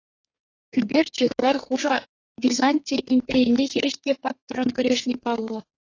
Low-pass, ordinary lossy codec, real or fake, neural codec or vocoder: 7.2 kHz; AAC, 32 kbps; fake; codec, 32 kHz, 1.9 kbps, SNAC